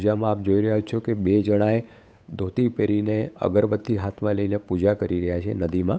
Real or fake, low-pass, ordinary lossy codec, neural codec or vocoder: fake; none; none; codec, 16 kHz, 8 kbps, FunCodec, trained on Chinese and English, 25 frames a second